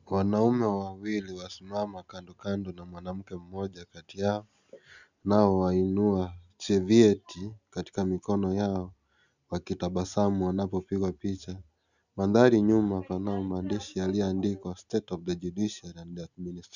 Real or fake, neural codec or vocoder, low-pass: real; none; 7.2 kHz